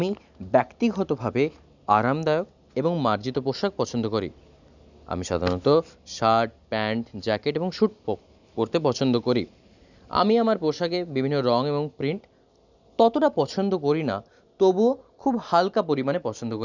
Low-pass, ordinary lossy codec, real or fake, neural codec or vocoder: 7.2 kHz; none; real; none